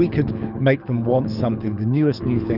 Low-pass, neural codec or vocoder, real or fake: 5.4 kHz; codec, 24 kHz, 6 kbps, HILCodec; fake